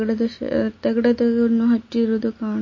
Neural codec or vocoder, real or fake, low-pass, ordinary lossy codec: none; real; 7.2 kHz; MP3, 32 kbps